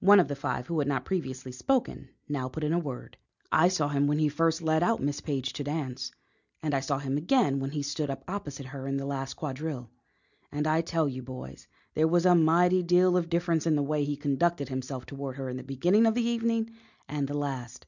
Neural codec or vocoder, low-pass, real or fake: none; 7.2 kHz; real